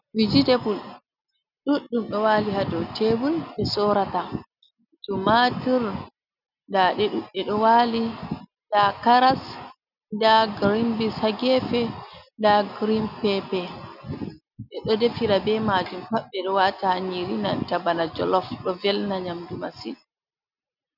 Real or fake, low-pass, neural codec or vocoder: real; 5.4 kHz; none